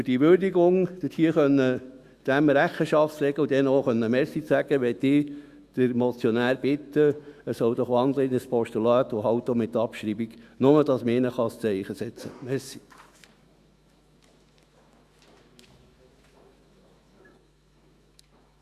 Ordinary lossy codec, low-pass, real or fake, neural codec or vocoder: Opus, 64 kbps; 14.4 kHz; fake; autoencoder, 48 kHz, 128 numbers a frame, DAC-VAE, trained on Japanese speech